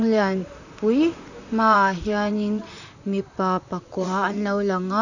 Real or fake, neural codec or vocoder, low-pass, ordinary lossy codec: fake; vocoder, 44.1 kHz, 128 mel bands, Pupu-Vocoder; 7.2 kHz; none